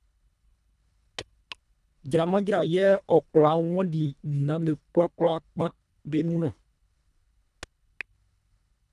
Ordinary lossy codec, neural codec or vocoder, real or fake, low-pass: none; codec, 24 kHz, 1.5 kbps, HILCodec; fake; none